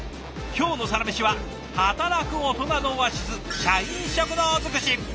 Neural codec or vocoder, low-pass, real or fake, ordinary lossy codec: none; none; real; none